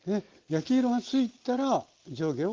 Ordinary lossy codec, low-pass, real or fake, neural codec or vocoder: Opus, 16 kbps; 7.2 kHz; real; none